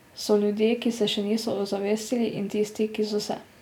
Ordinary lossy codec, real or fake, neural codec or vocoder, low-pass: none; real; none; 19.8 kHz